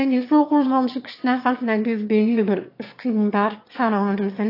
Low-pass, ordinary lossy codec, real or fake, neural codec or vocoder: 5.4 kHz; AAC, 32 kbps; fake; autoencoder, 22.05 kHz, a latent of 192 numbers a frame, VITS, trained on one speaker